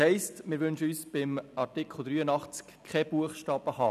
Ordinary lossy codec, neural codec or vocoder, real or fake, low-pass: none; none; real; 14.4 kHz